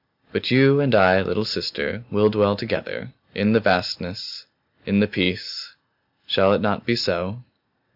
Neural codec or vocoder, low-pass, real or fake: none; 5.4 kHz; real